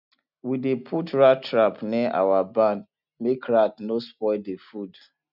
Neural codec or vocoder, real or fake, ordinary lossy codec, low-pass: none; real; none; 5.4 kHz